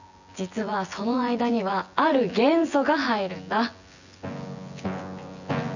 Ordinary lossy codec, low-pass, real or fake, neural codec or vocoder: none; 7.2 kHz; fake; vocoder, 24 kHz, 100 mel bands, Vocos